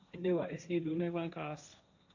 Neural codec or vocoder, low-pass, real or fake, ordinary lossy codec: codec, 16 kHz, 1.1 kbps, Voila-Tokenizer; 7.2 kHz; fake; none